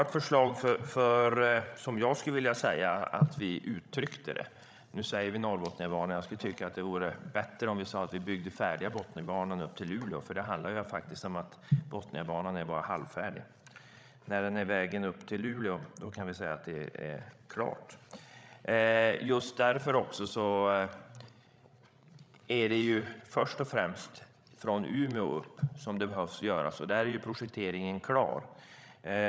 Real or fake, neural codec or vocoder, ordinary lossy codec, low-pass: fake; codec, 16 kHz, 16 kbps, FreqCodec, larger model; none; none